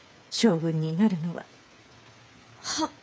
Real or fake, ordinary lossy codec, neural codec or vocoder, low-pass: fake; none; codec, 16 kHz, 8 kbps, FreqCodec, smaller model; none